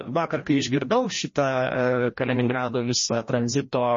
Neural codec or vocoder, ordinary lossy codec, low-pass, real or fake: codec, 16 kHz, 1 kbps, FreqCodec, larger model; MP3, 32 kbps; 7.2 kHz; fake